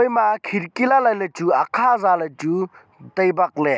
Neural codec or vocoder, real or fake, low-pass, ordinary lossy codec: none; real; none; none